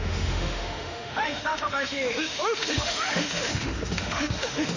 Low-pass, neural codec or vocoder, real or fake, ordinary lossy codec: 7.2 kHz; autoencoder, 48 kHz, 32 numbers a frame, DAC-VAE, trained on Japanese speech; fake; none